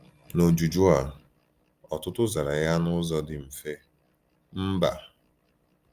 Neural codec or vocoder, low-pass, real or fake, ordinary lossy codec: none; 14.4 kHz; real; Opus, 32 kbps